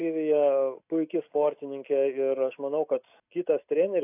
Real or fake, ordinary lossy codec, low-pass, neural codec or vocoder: real; AAC, 32 kbps; 3.6 kHz; none